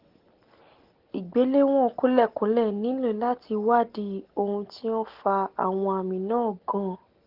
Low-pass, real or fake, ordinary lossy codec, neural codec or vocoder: 5.4 kHz; real; Opus, 16 kbps; none